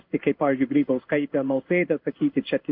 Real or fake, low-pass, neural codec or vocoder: fake; 5.4 kHz; codec, 16 kHz in and 24 kHz out, 1 kbps, XY-Tokenizer